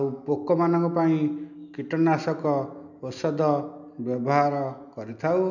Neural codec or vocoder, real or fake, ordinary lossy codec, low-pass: none; real; none; 7.2 kHz